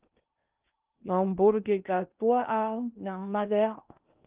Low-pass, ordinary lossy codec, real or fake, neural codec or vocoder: 3.6 kHz; Opus, 24 kbps; fake; codec, 16 kHz in and 24 kHz out, 0.8 kbps, FocalCodec, streaming, 65536 codes